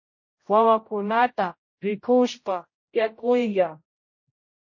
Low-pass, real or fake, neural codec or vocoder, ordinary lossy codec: 7.2 kHz; fake; codec, 16 kHz, 0.5 kbps, X-Codec, HuBERT features, trained on general audio; MP3, 32 kbps